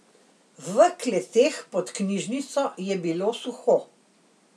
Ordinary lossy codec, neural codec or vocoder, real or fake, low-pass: none; none; real; none